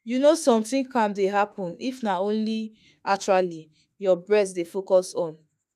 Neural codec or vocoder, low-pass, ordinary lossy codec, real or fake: autoencoder, 48 kHz, 32 numbers a frame, DAC-VAE, trained on Japanese speech; 14.4 kHz; none; fake